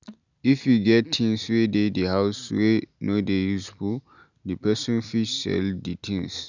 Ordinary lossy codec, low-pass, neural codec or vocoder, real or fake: none; 7.2 kHz; none; real